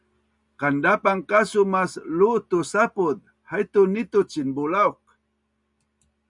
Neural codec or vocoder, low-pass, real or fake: none; 10.8 kHz; real